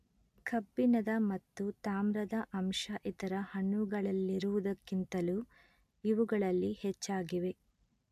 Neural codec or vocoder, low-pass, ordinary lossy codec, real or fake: none; 14.4 kHz; AAC, 96 kbps; real